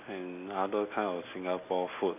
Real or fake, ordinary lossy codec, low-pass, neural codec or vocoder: real; none; 3.6 kHz; none